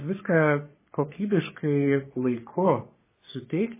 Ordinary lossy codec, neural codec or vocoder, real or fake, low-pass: MP3, 16 kbps; codec, 44.1 kHz, 3.4 kbps, Pupu-Codec; fake; 3.6 kHz